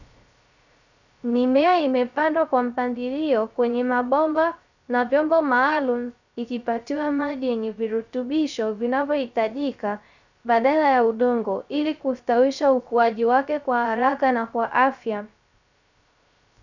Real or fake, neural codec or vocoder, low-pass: fake; codec, 16 kHz, 0.3 kbps, FocalCodec; 7.2 kHz